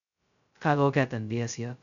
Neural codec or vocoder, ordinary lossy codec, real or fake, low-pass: codec, 16 kHz, 0.2 kbps, FocalCodec; none; fake; 7.2 kHz